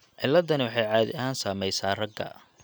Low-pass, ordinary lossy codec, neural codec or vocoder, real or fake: none; none; none; real